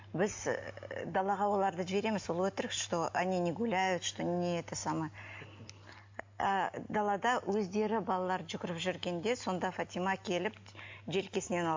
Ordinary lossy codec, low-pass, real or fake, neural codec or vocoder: MP3, 48 kbps; 7.2 kHz; real; none